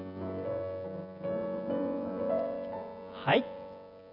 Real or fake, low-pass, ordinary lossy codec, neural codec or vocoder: fake; 5.4 kHz; none; vocoder, 24 kHz, 100 mel bands, Vocos